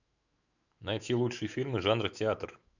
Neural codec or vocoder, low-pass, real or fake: autoencoder, 48 kHz, 128 numbers a frame, DAC-VAE, trained on Japanese speech; 7.2 kHz; fake